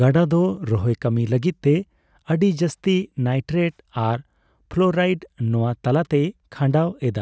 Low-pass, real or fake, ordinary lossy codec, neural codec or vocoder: none; real; none; none